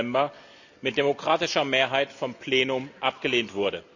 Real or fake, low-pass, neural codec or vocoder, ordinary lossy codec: real; 7.2 kHz; none; none